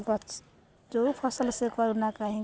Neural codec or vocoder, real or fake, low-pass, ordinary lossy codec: none; real; none; none